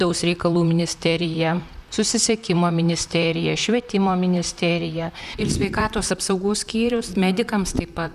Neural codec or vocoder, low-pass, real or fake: vocoder, 44.1 kHz, 128 mel bands, Pupu-Vocoder; 14.4 kHz; fake